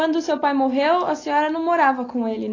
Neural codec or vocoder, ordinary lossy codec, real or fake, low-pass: none; AAC, 32 kbps; real; 7.2 kHz